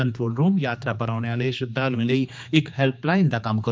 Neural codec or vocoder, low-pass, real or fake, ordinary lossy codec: codec, 16 kHz, 2 kbps, X-Codec, HuBERT features, trained on general audio; none; fake; none